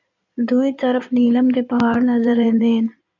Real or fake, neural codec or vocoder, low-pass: fake; codec, 16 kHz in and 24 kHz out, 2.2 kbps, FireRedTTS-2 codec; 7.2 kHz